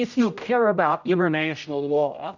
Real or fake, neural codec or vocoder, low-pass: fake; codec, 16 kHz, 0.5 kbps, X-Codec, HuBERT features, trained on general audio; 7.2 kHz